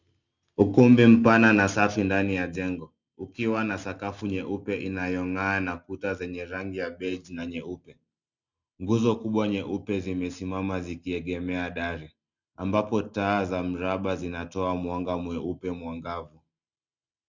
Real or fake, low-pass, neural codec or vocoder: real; 7.2 kHz; none